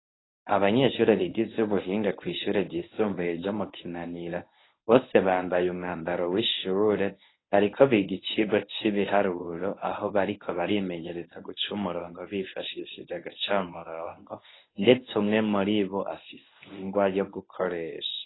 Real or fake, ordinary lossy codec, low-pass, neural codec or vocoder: fake; AAC, 16 kbps; 7.2 kHz; codec, 24 kHz, 0.9 kbps, WavTokenizer, medium speech release version 1